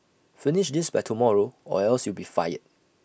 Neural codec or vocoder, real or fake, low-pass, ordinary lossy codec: none; real; none; none